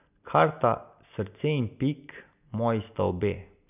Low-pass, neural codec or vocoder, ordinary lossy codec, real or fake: 3.6 kHz; none; none; real